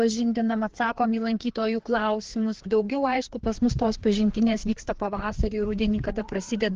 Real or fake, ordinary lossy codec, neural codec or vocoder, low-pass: fake; Opus, 16 kbps; codec, 16 kHz, 2 kbps, FreqCodec, larger model; 7.2 kHz